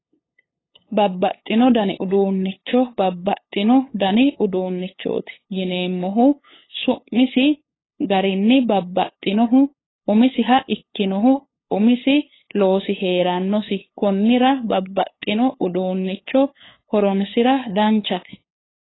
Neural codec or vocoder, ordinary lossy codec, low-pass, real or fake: codec, 16 kHz, 8 kbps, FunCodec, trained on LibriTTS, 25 frames a second; AAC, 16 kbps; 7.2 kHz; fake